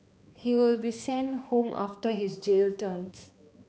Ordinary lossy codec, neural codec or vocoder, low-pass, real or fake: none; codec, 16 kHz, 2 kbps, X-Codec, HuBERT features, trained on balanced general audio; none; fake